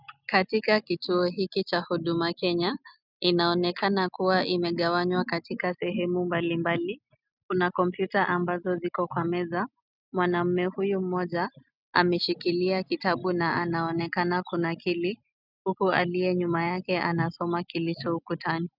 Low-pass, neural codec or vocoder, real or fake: 5.4 kHz; none; real